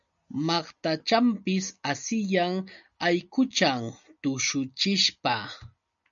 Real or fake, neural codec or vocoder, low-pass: real; none; 7.2 kHz